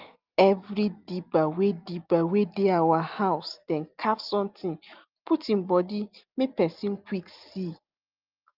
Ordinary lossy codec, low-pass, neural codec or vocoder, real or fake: Opus, 24 kbps; 5.4 kHz; none; real